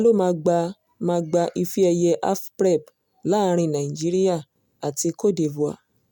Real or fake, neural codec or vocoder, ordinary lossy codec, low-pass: real; none; none; none